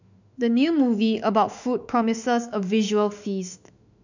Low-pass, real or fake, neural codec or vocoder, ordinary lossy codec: 7.2 kHz; fake; autoencoder, 48 kHz, 32 numbers a frame, DAC-VAE, trained on Japanese speech; none